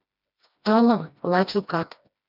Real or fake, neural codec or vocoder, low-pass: fake; codec, 16 kHz, 1 kbps, FreqCodec, smaller model; 5.4 kHz